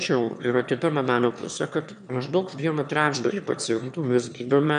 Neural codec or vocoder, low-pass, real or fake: autoencoder, 22.05 kHz, a latent of 192 numbers a frame, VITS, trained on one speaker; 9.9 kHz; fake